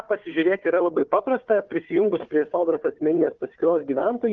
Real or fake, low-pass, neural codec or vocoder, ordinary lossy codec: fake; 7.2 kHz; codec, 16 kHz, 4 kbps, FunCodec, trained on Chinese and English, 50 frames a second; Opus, 32 kbps